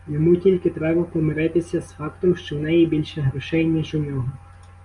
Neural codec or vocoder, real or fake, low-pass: none; real; 10.8 kHz